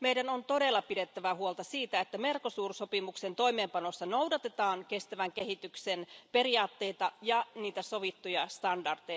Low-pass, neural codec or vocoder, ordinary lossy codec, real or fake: none; none; none; real